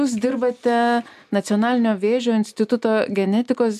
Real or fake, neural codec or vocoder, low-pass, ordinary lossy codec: real; none; 14.4 kHz; MP3, 96 kbps